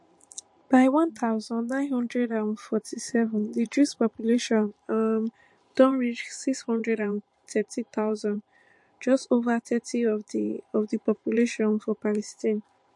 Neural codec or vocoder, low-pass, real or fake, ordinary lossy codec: vocoder, 44.1 kHz, 128 mel bands every 512 samples, BigVGAN v2; 10.8 kHz; fake; MP3, 48 kbps